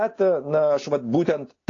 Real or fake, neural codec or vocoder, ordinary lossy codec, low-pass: real; none; AAC, 32 kbps; 7.2 kHz